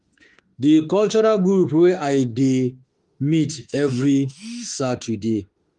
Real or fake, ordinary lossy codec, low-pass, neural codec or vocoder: fake; Opus, 24 kbps; 10.8 kHz; autoencoder, 48 kHz, 32 numbers a frame, DAC-VAE, trained on Japanese speech